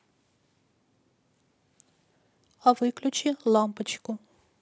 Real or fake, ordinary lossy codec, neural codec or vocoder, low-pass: real; none; none; none